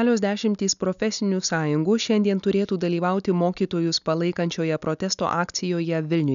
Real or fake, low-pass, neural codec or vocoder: real; 7.2 kHz; none